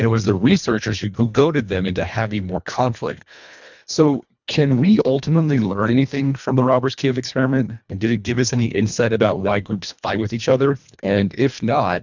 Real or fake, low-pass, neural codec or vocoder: fake; 7.2 kHz; codec, 24 kHz, 1.5 kbps, HILCodec